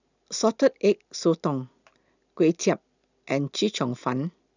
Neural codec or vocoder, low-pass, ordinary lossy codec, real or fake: none; 7.2 kHz; none; real